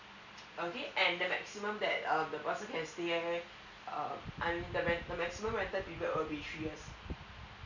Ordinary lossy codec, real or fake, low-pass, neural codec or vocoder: none; real; 7.2 kHz; none